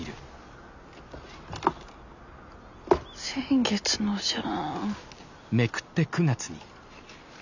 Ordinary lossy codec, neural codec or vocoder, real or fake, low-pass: none; none; real; 7.2 kHz